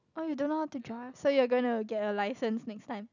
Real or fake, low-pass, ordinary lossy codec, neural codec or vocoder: real; 7.2 kHz; none; none